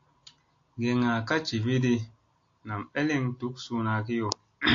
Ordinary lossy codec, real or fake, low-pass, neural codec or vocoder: AAC, 48 kbps; real; 7.2 kHz; none